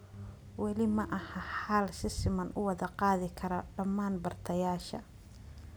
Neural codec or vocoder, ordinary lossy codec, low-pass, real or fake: none; none; none; real